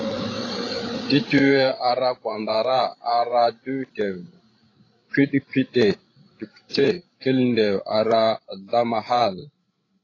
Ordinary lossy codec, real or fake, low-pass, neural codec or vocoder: AAC, 32 kbps; fake; 7.2 kHz; codec, 16 kHz, 16 kbps, FreqCodec, larger model